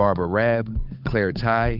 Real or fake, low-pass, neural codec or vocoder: fake; 5.4 kHz; codec, 16 kHz, 8 kbps, FunCodec, trained on Chinese and English, 25 frames a second